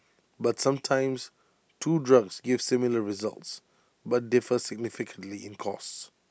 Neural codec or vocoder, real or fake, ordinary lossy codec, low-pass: none; real; none; none